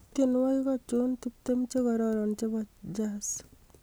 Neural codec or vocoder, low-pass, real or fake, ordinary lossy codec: none; none; real; none